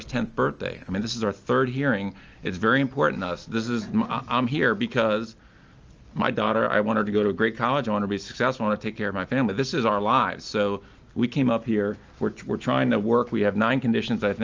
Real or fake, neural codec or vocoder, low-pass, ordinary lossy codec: real; none; 7.2 kHz; Opus, 24 kbps